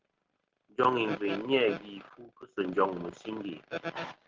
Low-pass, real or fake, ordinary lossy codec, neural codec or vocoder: 7.2 kHz; real; Opus, 16 kbps; none